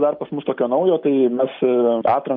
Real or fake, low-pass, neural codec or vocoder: real; 5.4 kHz; none